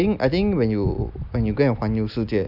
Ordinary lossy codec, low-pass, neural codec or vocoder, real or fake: none; 5.4 kHz; none; real